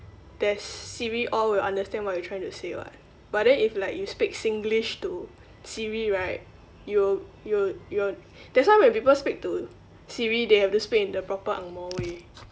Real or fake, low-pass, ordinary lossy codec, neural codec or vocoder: real; none; none; none